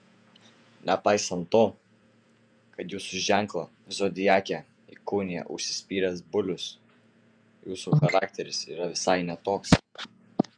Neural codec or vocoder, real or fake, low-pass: vocoder, 48 kHz, 128 mel bands, Vocos; fake; 9.9 kHz